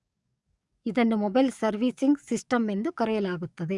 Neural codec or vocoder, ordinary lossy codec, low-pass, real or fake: codec, 44.1 kHz, 7.8 kbps, DAC; Opus, 64 kbps; 10.8 kHz; fake